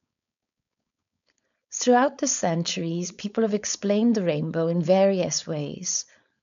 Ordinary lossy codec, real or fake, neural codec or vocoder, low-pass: none; fake; codec, 16 kHz, 4.8 kbps, FACodec; 7.2 kHz